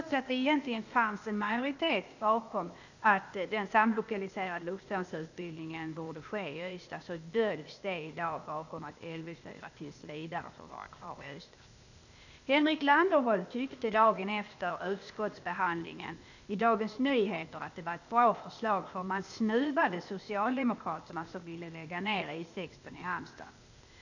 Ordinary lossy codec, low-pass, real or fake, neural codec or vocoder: none; 7.2 kHz; fake; codec, 16 kHz, 0.8 kbps, ZipCodec